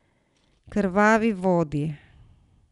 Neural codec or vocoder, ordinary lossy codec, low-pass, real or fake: none; none; 10.8 kHz; real